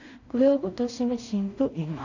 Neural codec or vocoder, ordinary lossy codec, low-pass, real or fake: codec, 16 kHz in and 24 kHz out, 0.4 kbps, LongCat-Audio-Codec, two codebook decoder; none; 7.2 kHz; fake